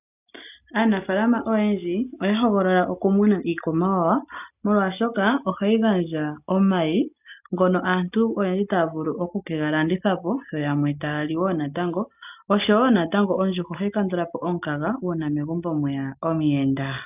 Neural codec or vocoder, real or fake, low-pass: none; real; 3.6 kHz